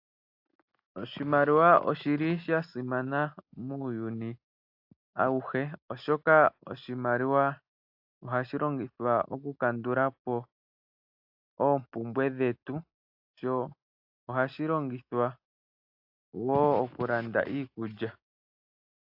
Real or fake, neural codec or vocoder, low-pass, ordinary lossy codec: real; none; 5.4 kHz; AAC, 48 kbps